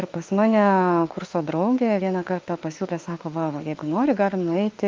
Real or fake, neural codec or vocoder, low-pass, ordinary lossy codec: fake; autoencoder, 48 kHz, 32 numbers a frame, DAC-VAE, trained on Japanese speech; 7.2 kHz; Opus, 24 kbps